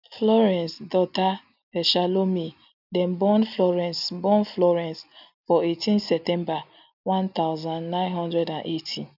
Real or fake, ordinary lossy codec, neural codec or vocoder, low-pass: real; none; none; 5.4 kHz